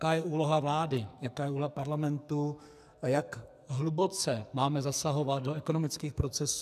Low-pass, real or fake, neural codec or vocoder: 14.4 kHz; fake; codec, 44.1 kHz, 2.6 kbps, SNAC